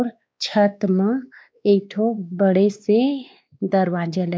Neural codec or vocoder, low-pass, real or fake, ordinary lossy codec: codec, 16 kHz, 2 kbps, X-Codec, WavLM features, trained on Multilingual LibriSpeech; none; fake; none